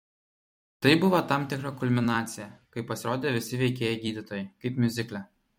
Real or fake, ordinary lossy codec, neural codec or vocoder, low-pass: fake; MP3, 64 kbps; vocoder, 44.1 kHz, 128 mel bands every 256 samples, BigVGAN v2; 19.8 kHz